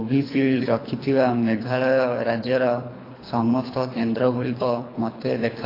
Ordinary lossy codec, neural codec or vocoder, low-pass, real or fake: AAC, 24 kbps; codec, 24 kHz, 3 kbps, HILCodec; 5.4 kHz; fake